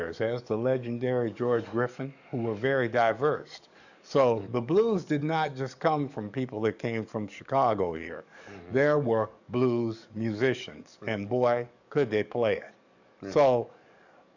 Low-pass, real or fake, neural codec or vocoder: 7.2 kHz; fake; codec, 44.1 kHz, 7.8 kbps, DAC